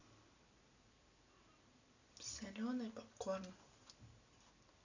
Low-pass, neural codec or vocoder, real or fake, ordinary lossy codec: 7.2 kHz; none; real; none